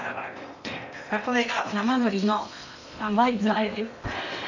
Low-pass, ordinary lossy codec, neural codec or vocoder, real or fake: 7.2 kHz; none; codec, 16 kHz in and 24 kHz out, 0.8 kbps, FocalCodec, streaming, 65536 codes; fake